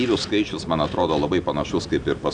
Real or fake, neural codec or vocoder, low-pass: real; none; 9.9 kHz